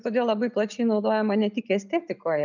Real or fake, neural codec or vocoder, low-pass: real; none; 7.2 kHz